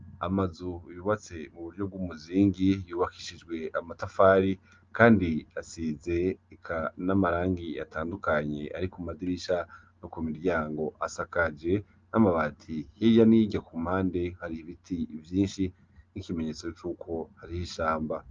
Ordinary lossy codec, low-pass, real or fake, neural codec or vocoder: Opus, 24 kbps; 7.2 kHz; real; none